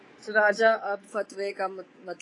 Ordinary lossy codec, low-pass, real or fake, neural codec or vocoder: AAC, 32 kbps; 10.8 kHz; fake; codec, 24 kHz, 3.1 kbps, DualCodec